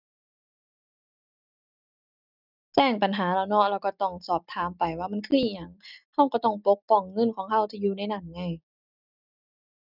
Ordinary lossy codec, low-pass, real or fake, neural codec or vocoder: none; 5.4 kHz; real; none